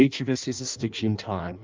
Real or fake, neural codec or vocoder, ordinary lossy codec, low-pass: fake; codec, 16 kHz in and 24 kHz out, 0.6 kbps, FireRedTTS-2 codec; Opus, 24 kbps; 7.2 kHz